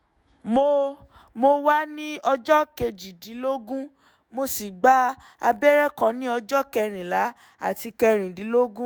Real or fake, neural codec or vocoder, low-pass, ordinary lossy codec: fake; autoencoder, 48 kHz, 128 numbers a frame, DAC-VAE, trained on Japanese speech; none; none